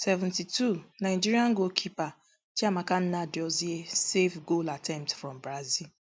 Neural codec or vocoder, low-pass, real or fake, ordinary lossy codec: none; none; real; none